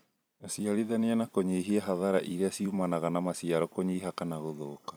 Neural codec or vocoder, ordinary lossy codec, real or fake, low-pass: vocoder, 44.1 kHz, 128 mel bands every 256 samples, BigVGAN v2; none; fake; none